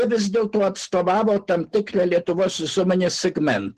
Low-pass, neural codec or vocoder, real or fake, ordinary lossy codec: 14.4 kHz; none; real; Opus, 16 kbps